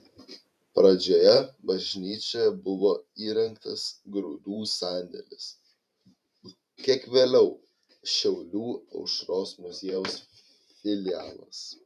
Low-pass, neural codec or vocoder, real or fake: 14.4 kHz; vocoder, 44.1 kHz, 128 mel bands every 512 samples, BigVGAN v2; fake